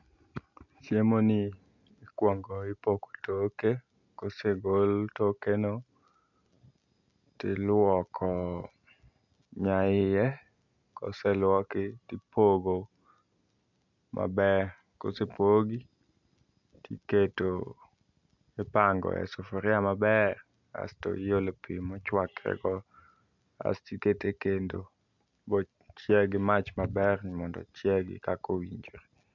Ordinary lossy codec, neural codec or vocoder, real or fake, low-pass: none; none; real; 7.2 kHz